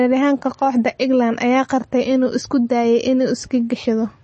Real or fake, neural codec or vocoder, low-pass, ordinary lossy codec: real; none; 10.8 kHz; MP3, 32 kbps